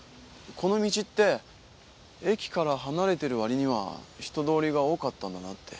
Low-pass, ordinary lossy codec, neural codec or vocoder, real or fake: none; none; none; real